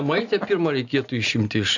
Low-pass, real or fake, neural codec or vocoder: 7.2 kHz; real; none